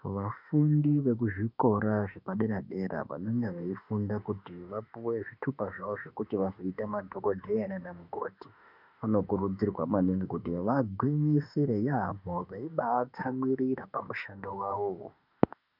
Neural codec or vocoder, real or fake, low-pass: autoencoder, 48 kHz, 32 numbers a frame, DAC-VAE, trained on Japanese speech; fake; 5.4 kHz